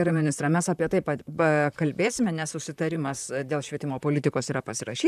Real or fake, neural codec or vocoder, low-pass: fake; vocoder, 44.1 kHz, 128 mel bands, Pupu-Vocoder; 14.4 kHz